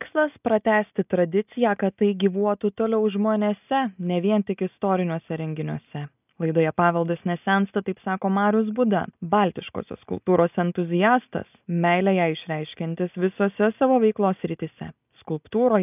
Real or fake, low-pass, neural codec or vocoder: real; 3.6 kHz; none